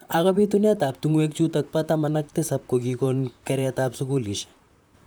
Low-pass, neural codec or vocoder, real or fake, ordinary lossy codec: none; vocoder, 44.1 kHz, 128 mel bands, Pupu-Vocoder; fake; none